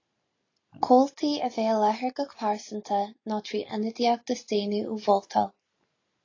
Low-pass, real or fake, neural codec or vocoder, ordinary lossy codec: 7.2 kHz; real; none; AAC, 32 kbps